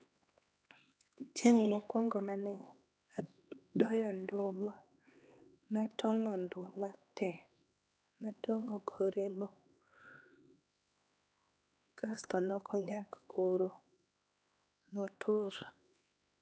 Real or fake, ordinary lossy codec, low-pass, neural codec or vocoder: fake; none; none; codec, 16 kHz, 2 kbps, X-Codec, HuBERT features, trained on LibriSpeech